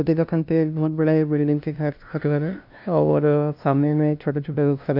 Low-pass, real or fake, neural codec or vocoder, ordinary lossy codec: 5.4 kHz; fake; codec, 16 kHz, 0.5 kbps, FunCodec, trained on LibriTTS, 25 frames a second; none